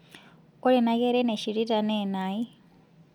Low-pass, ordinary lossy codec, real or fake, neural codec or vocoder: 19.8 kHz; none; real; none